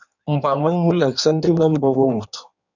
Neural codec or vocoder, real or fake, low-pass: codec, 16 kHz in and 24 kHz out, 1.1 kbps, FireRedTTS-2 codec; fake; 7.2 kHz